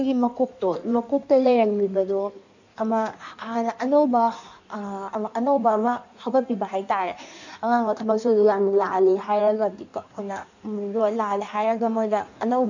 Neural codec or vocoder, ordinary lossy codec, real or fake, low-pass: codec, 16 kHz in and 24 kHz out, 1.1 kbps, FireRedTTS-2 codec; none; fake; 7.2 kHz